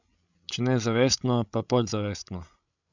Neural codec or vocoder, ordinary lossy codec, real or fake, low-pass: codec, 16 kHz, 16 kbps, FreqCodec, larger model; none; fake; 7.2 kHz